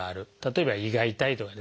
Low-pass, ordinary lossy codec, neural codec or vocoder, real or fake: none; none; none; real